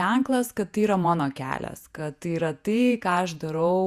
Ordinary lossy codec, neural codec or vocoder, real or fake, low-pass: Opus, 64 kbps; vocoder, 48 kHz, 128 mel bands, Vocos; fake; 14.4 kHz